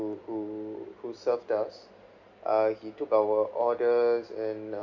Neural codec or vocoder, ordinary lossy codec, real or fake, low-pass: none; Opus, 64 kbps; real; 7.2 kHz